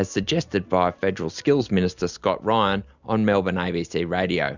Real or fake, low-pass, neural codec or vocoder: real; 7.2 kHz; none